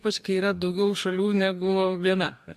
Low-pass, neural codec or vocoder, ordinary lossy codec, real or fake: 14.4 kHz; codec, 44.1 kHz, 2.6 kbps, DAC; AAC, 96 kbps; fake